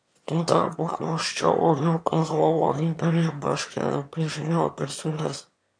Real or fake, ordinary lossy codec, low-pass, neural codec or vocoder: fake; MP3, 64 kbps; 9.9 kHz; autoencoder, 22.05 kHz, a latent of 192 numbers a frame, VITS, trained on one speaker